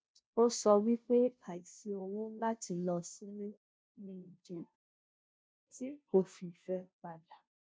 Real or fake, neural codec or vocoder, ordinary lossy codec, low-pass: fake; codec, 16 kHz, 0.5 kbps, FunCodec, trained on Chinese and English, 25 frames a second; none; none